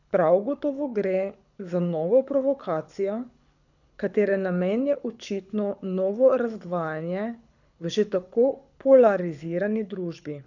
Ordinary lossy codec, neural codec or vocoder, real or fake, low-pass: none; codec, 24 kHz, 6 kbps, HILCodec; fake; 7.2 kHz